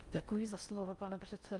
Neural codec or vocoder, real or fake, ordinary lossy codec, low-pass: codec, 16 kHz in and 24 kHz out, 0.6 kbps, FocalCodec, streaming, 4096 codes; fake; Opus, 32 kbps; 10.8 kHz